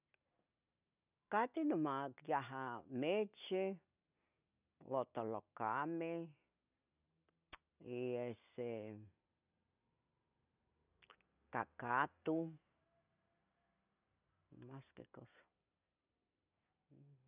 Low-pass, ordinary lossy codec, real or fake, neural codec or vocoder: 3.6 kHz; none; real; none